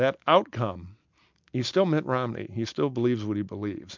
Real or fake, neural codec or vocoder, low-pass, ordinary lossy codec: real; none; 7.2 kHz; MP3, 64 kbps